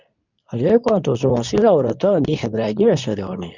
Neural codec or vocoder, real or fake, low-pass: codec, 24 kHz, 0.9 kbps, WavTokenizer, medium speech release version 2; fake; 7.2 kHz